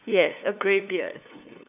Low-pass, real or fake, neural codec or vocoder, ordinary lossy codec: 3.6 kHz; fake; codec, 16 kHz, 2 kbps, FunCodec, trained on LibriTTS, 25 frames a second; none